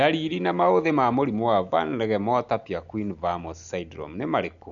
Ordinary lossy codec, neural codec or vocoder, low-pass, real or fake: none; none; 7.2 kHz; real